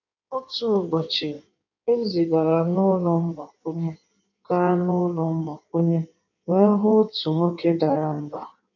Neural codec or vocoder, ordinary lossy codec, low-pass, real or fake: codec, 16 kHz in and 24 kHz out, 1.1 kbps, FireRedTTS-2 codec; none; 7.2 kHz; fake